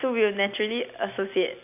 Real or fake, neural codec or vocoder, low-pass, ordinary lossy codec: real; none; 3.6 kHz; none